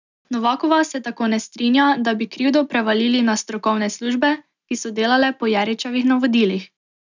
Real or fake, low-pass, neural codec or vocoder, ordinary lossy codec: real; 7.2 kHz; none; none